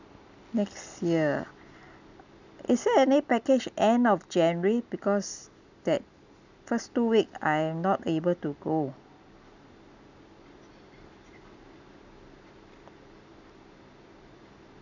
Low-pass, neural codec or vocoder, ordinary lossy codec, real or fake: 7.2 kHz; none; none; real